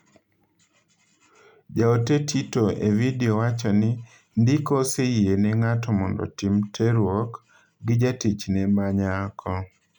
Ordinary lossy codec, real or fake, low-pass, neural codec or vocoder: none; real; 19.8 kHz; none